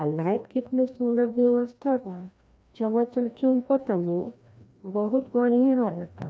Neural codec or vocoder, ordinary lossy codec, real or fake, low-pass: codec, 16 kHz, 1 kbps, FreqCodec, larger model; none; fake; none